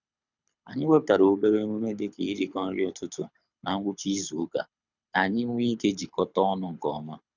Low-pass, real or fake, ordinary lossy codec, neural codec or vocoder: 7.2 kHz; fake; none; codec, 24 kHz, 6 kbps, HILCodec